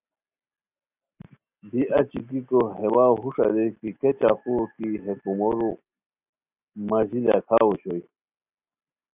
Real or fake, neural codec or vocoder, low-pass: real; none; 3.6 kHz